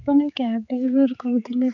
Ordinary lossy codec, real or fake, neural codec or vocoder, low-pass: none; fake; codec, 16 kHz, 4 kbps, X-Codec, HuBERT features, trained on general audio; 7.2 kHz